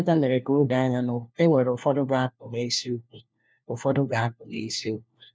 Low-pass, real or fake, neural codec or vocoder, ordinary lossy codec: none; fake; codec, 16 kHz, 1 kbps, FunCodec, trained on LibriTTS, 50 frames a second; none